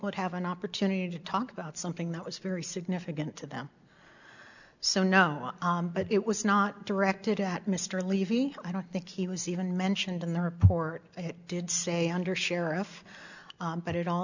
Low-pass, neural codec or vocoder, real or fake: 7.2 kHz; none; real